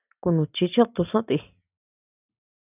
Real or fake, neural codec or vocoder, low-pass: real; none; 3.6 kHz